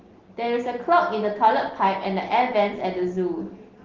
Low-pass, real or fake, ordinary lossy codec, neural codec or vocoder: 7.2 kHz; real; Opus, 16 kbps; none